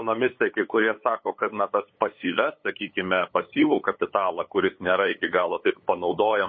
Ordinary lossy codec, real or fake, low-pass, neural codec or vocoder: MP3, 24 kbps; fake; 7.2 kHz; codec, 16 kHz, 8 kbps, FunCodec, trained on LibriTTS, 25 frames a second